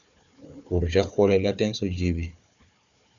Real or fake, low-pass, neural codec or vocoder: fake; 7.2 kHz; codec, 16 kHz, 4 kbps, FunCodec, trained on Chinese and English, 50 frames a second